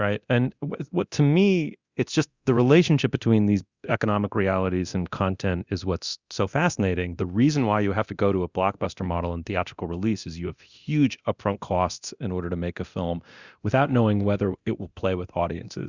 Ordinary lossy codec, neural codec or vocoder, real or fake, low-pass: Opus, 64 kbps; codec, 24 kHz, 0.9 kbps, DualCodec; fake; 7.2 kHz